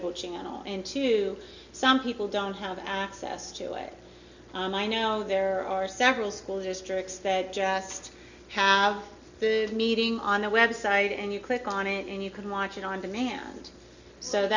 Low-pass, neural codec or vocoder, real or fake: 7.2 kHz; none; real